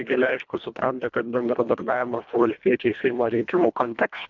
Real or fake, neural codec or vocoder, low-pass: fake; codec, 24 kHz, 1.5 kbps, HILCodec; 7.2 kHz